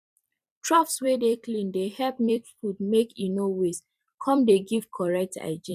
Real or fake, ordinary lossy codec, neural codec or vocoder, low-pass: fake; none; vocoder, 44.1 kHz, 128 mel bands every 256 samples, BigVGAN v2; 14.4 kHz